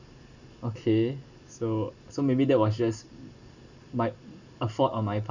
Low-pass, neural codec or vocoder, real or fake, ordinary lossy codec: 7.2 kHz; none; real; none